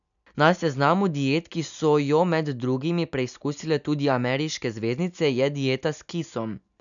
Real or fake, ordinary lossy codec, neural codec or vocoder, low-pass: real; none; none; 7.2 kHz